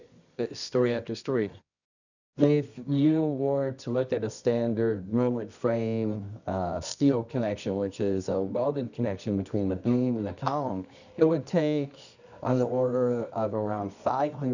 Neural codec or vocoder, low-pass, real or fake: codec, 24 kHz, 0.9 kbps, WavTokenizer, medium music audio release; 7.2 kHz; fake